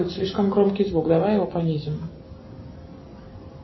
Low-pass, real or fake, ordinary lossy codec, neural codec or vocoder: 7.2 kHz; real; MP3, 24 kbps; none